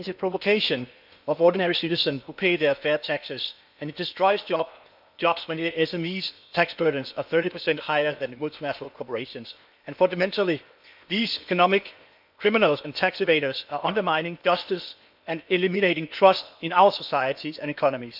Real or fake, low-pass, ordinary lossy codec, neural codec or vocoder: fake; 5.4 kHz; none; codec, 16 kHz in and 24 kHz out, 0.8 kbps, FocalCodec, streaming, 65536 codes